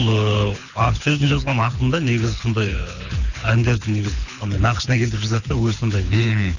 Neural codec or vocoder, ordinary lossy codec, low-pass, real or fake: codec, 24 kHz, 6 kbps, HILCodec; none; 7.2 kHz; fake